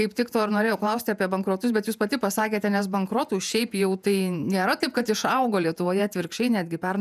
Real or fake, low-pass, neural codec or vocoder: fake; 14.4 kHz; vocoder, 48 kHz, 128 mel bands, Vocos